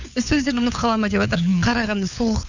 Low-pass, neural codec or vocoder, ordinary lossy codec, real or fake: 7.2 kHz; codec, 16 kHz, 4 kbps, FunCodec, trained on LibriTTS, 50 frames a second; none; fake